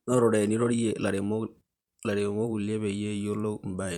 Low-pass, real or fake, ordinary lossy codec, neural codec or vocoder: 19.8 kHz; fake; Opus, 64 kbps; vocoder, 44.1 kHz, 128 mel bands every 512 samples, BigVGAN v2